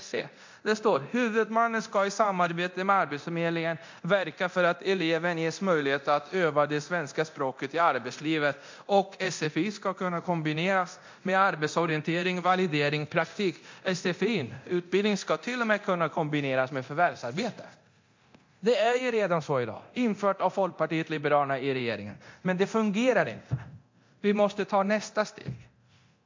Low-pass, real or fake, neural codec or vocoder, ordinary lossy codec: 7.2 kHz; fake; codec, 24 kHz, 0.9 kbps, DualCodec; MP3, 48 kbps